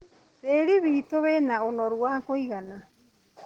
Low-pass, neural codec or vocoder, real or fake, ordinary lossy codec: 19.8 kHz; none; real; Opus, 16 kbps